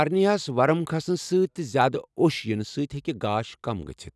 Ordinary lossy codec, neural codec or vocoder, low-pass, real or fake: none; none; none; real